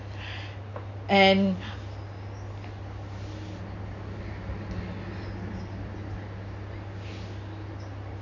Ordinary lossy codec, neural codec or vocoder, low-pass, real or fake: none; none; 7.2 kHz; real